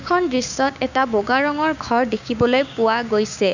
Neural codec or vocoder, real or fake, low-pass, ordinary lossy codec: codec, 16 kHz, 6 kbps, DAC; fake; 7.2 kHz; none